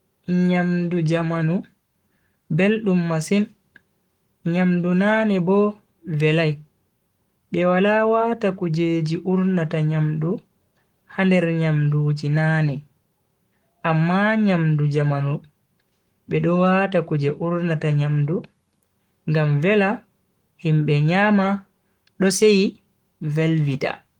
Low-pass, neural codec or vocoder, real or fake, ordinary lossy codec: 19.8 kHz; codec, 44.1 kHz, 7.8 kbps, Pupu-Codec; fake; Opus, 24 kbps